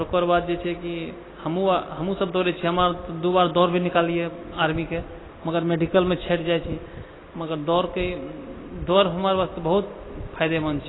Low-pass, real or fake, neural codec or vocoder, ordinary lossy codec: 7.2 kHz; real; none; AAC, 16 kbps